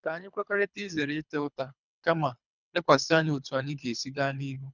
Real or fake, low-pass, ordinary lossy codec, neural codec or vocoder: fake; 7.2 kHz; none; codec, 24 kHz, 3 kbps, HILCodec